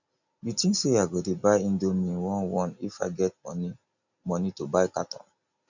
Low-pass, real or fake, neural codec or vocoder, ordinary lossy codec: 7.2 kHz; real; none; none